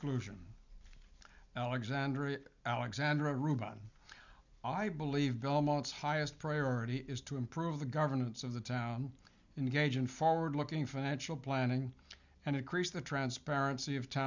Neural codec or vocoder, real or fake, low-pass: none; real; 7.2 kHz